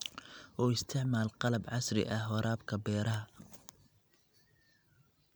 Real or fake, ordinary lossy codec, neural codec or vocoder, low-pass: real; none; none; none